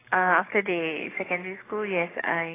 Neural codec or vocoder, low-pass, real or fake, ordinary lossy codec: codec, 44.1 kHz, 7.8 kbps, DAC; 3.6 kHz; fake; AAC, 16 kbps